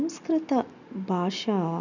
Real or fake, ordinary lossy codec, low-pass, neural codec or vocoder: real; none; 7.2 kHz; none